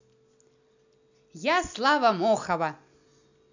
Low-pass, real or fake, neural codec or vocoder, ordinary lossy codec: 7.2 kHz; real; none; none